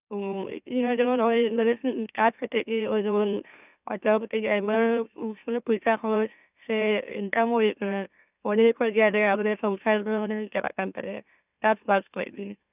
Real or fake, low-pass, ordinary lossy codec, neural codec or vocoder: fake; 3.6 kHz; none; autoencoder, 44.1 kHz, a latent of 192 numbers a frame, MeloTTS